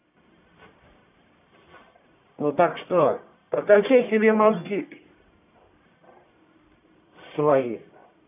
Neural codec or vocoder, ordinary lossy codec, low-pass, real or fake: codec, 44.1 kHz, 1.7 kbps, Pupu-Codec; none; 3.6 kHz; fake